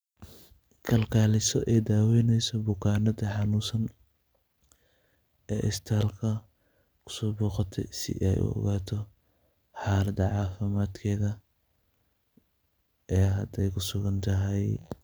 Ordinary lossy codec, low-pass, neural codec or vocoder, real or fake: none; none; none; real